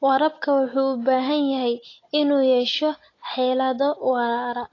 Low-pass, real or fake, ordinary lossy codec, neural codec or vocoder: 7.2 kHz; real; AAC, 32 kbps; none